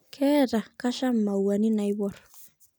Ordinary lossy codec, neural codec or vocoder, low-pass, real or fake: none; none; none; real